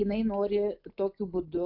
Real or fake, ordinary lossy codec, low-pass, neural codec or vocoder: real; AAC, 48 kbps; 5.4 kHz; none